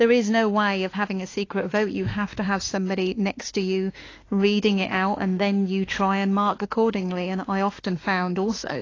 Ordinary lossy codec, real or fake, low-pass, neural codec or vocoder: AAC, 32 kbps; fake; 7.2 kHz; autoencoder, 48 kHz, 32 numbers a frame, DAC-VAE, trained on Japanese speech